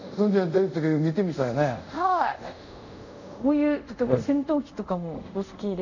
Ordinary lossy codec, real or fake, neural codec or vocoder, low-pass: none; fake; codec, 24 kHz, 0.5 kbps, DualCodec; 7.2 kHz